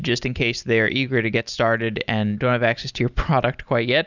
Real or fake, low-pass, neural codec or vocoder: real; 7.2 kHz; none